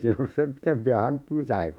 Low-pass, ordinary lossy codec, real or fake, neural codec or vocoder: 19.8 kHz; none; fake; autoencoder, 48 kHz, 32 numbers a frame, DAC-VAE, trained on Japanese speech